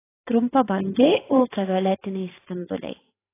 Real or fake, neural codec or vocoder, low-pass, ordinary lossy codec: fake; vocoder, 44.1 kHz, 128 mel bands every 512 samples, BigVGAN v2; 3.6 kHz; AAC, 16 kbps